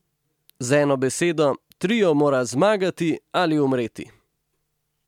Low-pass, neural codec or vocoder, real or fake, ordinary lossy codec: 19.8 kHz; none; real; MP3, 96 kbps